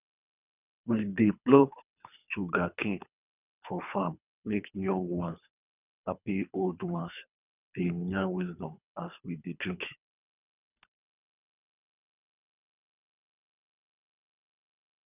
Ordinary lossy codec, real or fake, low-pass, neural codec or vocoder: none; fake; 3.6 kHz; codec, 24 kHz, 3 kbps, HILCodec